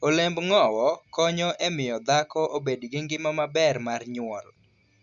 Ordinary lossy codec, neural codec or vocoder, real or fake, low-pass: Opus, 64 kbps; none; real; 7.2 kHz